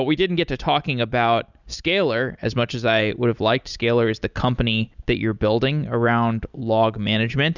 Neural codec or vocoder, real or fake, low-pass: none; real; 7.2 kHz